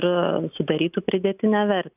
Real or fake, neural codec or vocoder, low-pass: real; none; 3.6 kHz